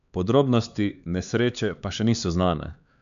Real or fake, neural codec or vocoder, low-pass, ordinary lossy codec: fake; codec, 16 kHz, 4 kbps, X-Codec, HuBERT features, trained on LibriSpeech; 7.2 kHz; none